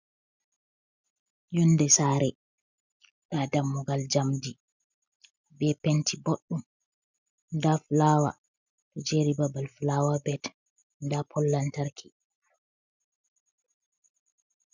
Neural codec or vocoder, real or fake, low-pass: none; real; 7.2 kHz